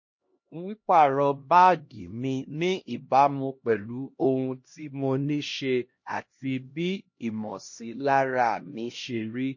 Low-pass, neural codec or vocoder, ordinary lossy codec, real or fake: 7.2 kHz; codec, 16 kHz, 1 kbps, X-Codec, HuBERT features, trained on LibriSpeech; MP3, 32 kbps; fake